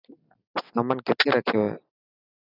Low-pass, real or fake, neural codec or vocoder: 5.4 kHz; real; none